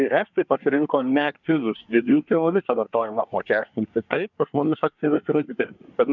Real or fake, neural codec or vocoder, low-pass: fake; codec, 24 kHz, 1 kbps, SNAC; 7.2 kHz